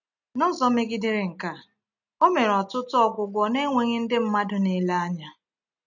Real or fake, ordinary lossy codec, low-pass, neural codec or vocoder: real; none; 7.2 kHz; none